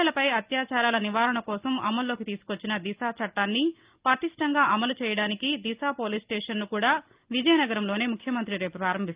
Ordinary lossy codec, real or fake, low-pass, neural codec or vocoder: Opus, 24 kbps; real; 3.6 kHz; none